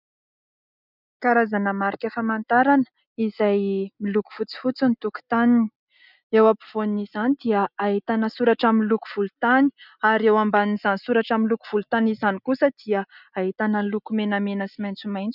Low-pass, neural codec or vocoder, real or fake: 5.4 kHz; none; real